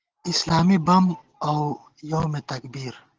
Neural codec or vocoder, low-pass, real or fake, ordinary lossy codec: none; 7.2 kHz; real; Opus, 16 kbps